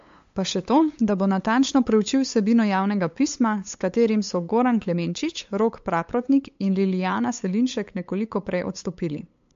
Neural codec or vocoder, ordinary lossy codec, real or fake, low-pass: codec, 16 kHz, 8 kbps, FunCodec, trained on LibriTTS, 25 frames a second; MP3, 48 kbps; fake; 7.2 kHz